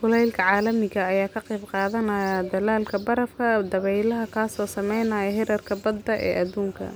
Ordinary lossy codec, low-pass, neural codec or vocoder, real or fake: none; none; none; real